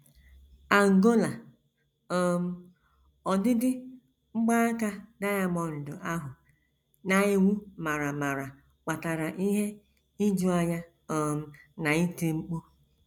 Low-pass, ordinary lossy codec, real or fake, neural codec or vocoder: 19.8 kHz; none; real; none